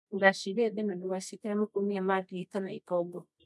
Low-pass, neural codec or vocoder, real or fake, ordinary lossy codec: none; codec, 24 kHz, 0.9 kbps, WavTokenizer, medium music audio release; fake; none